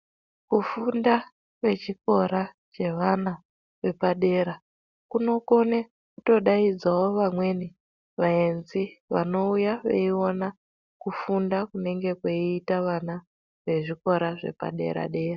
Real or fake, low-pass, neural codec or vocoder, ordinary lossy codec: real; 7.2 kHz; none; Opus, 64 kbps